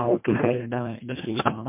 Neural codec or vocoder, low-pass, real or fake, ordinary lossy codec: codec, 24 kHz, 1.5 kbps, HILCodec; 3.6 kHz; fake; MP3, 32 kbps